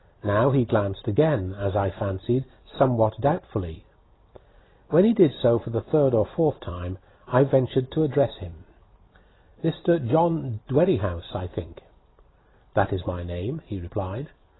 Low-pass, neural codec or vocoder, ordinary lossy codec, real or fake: 7.2 kHz; none; AAC, 16 kbps; real